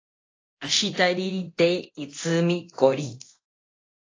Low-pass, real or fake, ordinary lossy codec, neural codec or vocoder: 7.2 kHz; fake; AAC, 32 kbps; codec, 24 kHz, 0.9 kbps, DualCodec